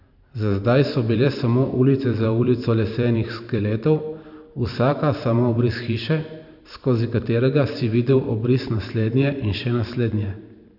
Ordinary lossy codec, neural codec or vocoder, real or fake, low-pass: none; vocoder, 44.1 kHz, 128 mel bands every 512 samples, BigVGAN v2; fake; 5.4 kHz